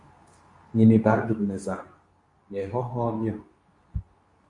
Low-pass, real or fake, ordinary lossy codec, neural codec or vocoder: 10.8 kHz; fake; MP3, 64 kbps; codec, 24 kHz, 0.9 kbps, WavTokenizer, medium speech release version 2